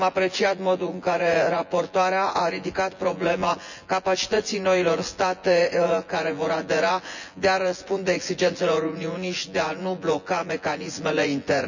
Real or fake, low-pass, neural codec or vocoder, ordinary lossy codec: fake; 7.2 kHz; vocoder, 24 kHz, 100 mel bands, Vocos; none